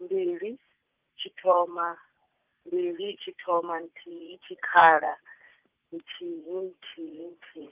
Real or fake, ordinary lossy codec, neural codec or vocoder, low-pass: fake; Opus, 24 kbps; vocoder, 44.1 kHz, 80 mel bands, Vocos; 3.6 kHz